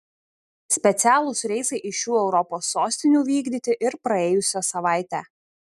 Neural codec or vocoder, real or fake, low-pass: none; real; 14.4 kHz